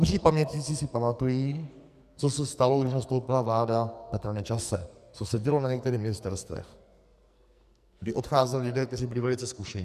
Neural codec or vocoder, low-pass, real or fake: codec, 44.1 kHz, 2.6 kbps, SNAC; 14.4 kHz; fake